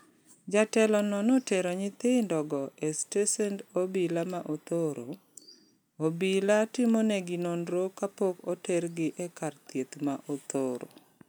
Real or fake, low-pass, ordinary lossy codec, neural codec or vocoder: real; none; none; none